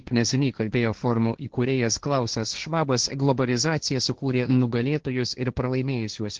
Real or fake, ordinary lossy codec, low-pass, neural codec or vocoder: fake; Opus, 16 kbps; 7.2 kHz; codec, 16 kHz, 1.1 kbps, Voila-Tokenizer